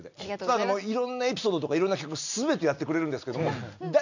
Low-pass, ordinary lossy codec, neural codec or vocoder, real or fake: 7.2 kHz; none; none; real